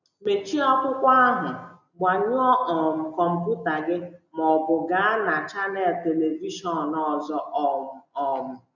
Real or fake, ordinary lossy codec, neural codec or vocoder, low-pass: real; none; none; 7.2 kHz